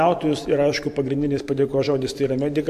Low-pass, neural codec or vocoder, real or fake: 14.4 kHz; vocoder, 44.1 kHz, 128 mel bands every 512 samples, BigVGAN v2; fake